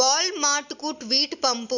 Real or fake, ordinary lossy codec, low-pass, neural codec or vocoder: real; none; 7.2 kHz; none